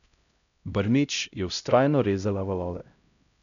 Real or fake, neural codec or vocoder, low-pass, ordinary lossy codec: fake; codec, 16 kHz, 0.5 kbps, X-Codec, HuBERT features, trained on LibriSpeech; 7.2 kHz; none